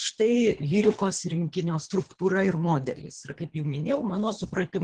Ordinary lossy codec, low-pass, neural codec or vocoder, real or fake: Opus, 16 kbps; 9.9 kHz; codec, 24 kHz, 3 kbps, HILCodec; fake